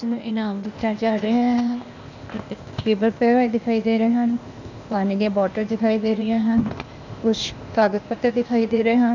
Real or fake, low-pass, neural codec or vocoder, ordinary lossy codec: fake; 7.2 kHz; codec, 16 kHz, 0.8 kbps, ZipCodec; none